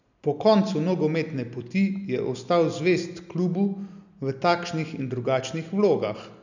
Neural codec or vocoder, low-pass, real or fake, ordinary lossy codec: none; 7.2 kHz; real; none